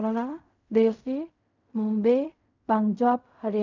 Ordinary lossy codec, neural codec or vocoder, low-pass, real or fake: none; codec, 16 kHz in and 24 kHz out, 0.4 kbps, LongCat-Audio-Codec, fine tuned four codebook decoder; 7.2 kHz; fake